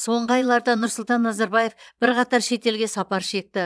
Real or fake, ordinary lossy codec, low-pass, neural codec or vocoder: fake; none; none; vocoder, 22.05 kHz, 80 mel bands, Vocos